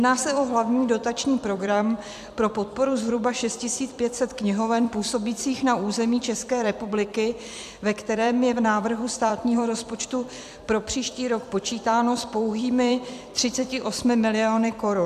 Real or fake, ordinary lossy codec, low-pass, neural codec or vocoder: fake; AAC, 96 kbps; 14.4 kHz; vocoder, 44.1 kHz, 128 mel bands every 256 samples, BigVGAN v2